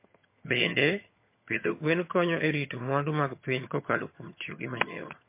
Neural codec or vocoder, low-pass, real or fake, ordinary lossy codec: vocoder, 22.05 kHz, 80 mel bands, HiFi-GAN; 3.6 kHz; fake; MP3, 24 kbps